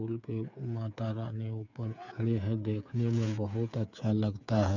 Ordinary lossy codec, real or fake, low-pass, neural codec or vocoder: none; fake; 7.2 kHz; codec, 16 kHz, 8 kbps, FreqCodec, smaller model